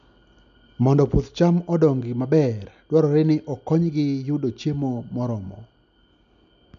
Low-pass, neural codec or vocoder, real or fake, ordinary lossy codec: 7.2 kHz; none; real; none